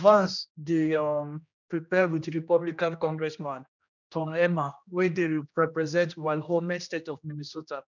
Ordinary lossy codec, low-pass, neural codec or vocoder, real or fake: none; 7.2 kHz; codec, 16 kHz, 1 kbps, X-Codec, HuBERT features, trained on general audio; fake